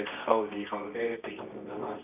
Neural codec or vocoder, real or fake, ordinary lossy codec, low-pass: codec, 24 kHz, 0.9 kbps, WavTokenizer, medium music audio release; fake; none; 3.6 kHz